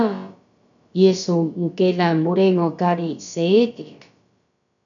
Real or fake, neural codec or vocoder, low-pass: fake; codec, 16 kHz, about 1 kbps, DyCAST, with the encoder's durations; 7.2 kHz